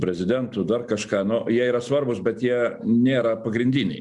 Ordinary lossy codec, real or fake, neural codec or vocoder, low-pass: Opus, 64 kbps; real; none; 10.8 kHz